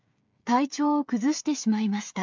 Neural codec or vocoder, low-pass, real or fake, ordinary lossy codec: codec, 16 kHz, 16 kbps, FreqCodec, smaller model; 7.2 kHz; fake; MP3, 64 kbps